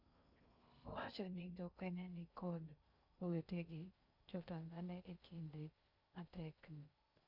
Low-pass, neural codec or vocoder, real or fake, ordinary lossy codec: 5.4 kHz; codec, 16 kHz in and 24 kHz out, 0.6 kbps, FocalCodec, streaming, 2048 codes; fake; Opus, 64 kbps